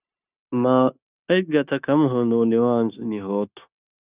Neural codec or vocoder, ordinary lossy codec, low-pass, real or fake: codec, 16 kHz, 0.9 kbps, LongCat-Audio-Codec; Opus, 64 kbps; 3.6 kHz; fake